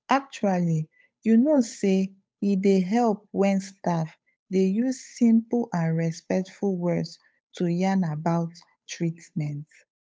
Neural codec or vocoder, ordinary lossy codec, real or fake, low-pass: codec, 16 kHz, 8 kbps, FunCodec, trained on Chinese and English, 25 frames a second; none; fake; none